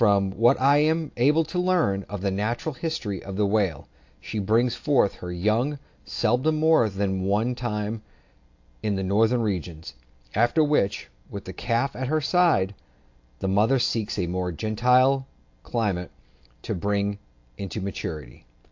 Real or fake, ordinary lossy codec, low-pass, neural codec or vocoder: real; AAC, 48 kbps; 7.2 kHz; none